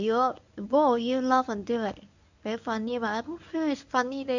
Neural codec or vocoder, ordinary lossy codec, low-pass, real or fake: codec, 24 kHz, 0.9 kbps, WavTokenizer, medium speech release version 1; none; 7.2 kHz; fake